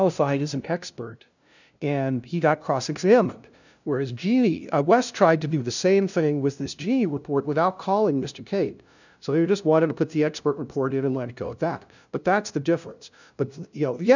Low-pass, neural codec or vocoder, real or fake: 7.2 kHz; codec, 16 kHz, 0.5 kbps, FunCodec, trained on LibriTTS, 25 frames a second; fake